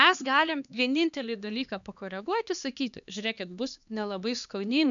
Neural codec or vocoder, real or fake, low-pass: codec, 16 kHz, 2 kbps, X-Codec, HuBERT features, trained on LibriSpeech; fake; 7.2 kHz